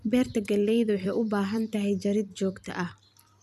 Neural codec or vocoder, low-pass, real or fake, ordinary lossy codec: vocoder, 44.1 kHz, 128 mel bands every 256 samples, BigVGAN v2; 14.4 kHz; fake; none